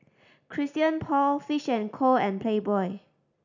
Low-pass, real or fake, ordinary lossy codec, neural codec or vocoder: 7.2 kHz; real; none; none